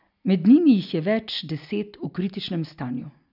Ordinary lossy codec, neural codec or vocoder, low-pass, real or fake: none; none; 5.4 kHz; real